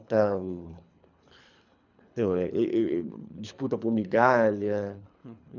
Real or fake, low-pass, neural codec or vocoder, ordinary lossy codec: fake; 7.2 kHz; codec, 24 kHz, 3 kbps, HILCodec; none